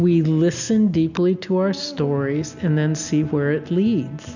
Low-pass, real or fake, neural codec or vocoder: 7.2 kHz; real; none